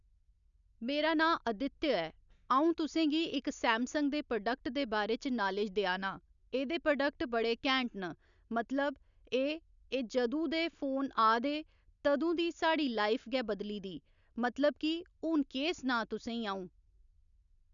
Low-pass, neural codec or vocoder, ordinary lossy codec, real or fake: 7.2 kHz; none; none; real